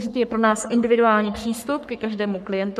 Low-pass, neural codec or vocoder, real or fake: 14.4 kHz; codec, 44.1 kHz, 3.4 kbps, Pupu-Codec; fake